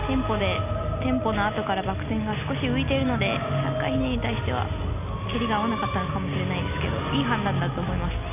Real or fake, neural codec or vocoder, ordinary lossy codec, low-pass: real; none; none; 3.6 kHz